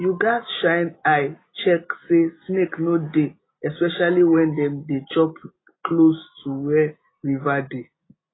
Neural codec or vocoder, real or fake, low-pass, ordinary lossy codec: none; real; 7.2 kHz; AAC, 16 kbps